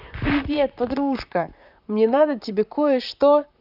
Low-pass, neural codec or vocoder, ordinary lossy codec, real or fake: 5.4 kHz; vocoder, 44.1 kHz, 128 mel bands, Pupu-Vocoder; none; fake